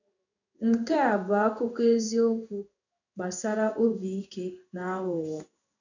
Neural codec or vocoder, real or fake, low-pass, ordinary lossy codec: codec, 16 kHz in and 24 kHz out, 1 kbps, XY-Tokenizer; fake; 7.2 kHz; none